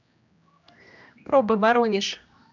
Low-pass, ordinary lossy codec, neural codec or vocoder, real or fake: 7.2 kHz; none; codec, 16 kHz, 1 kbps, X-Codec, HuBERT features, trained on general audio; fake